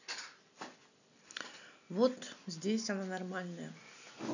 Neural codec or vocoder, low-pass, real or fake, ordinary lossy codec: none; 7.2 kHz; real; none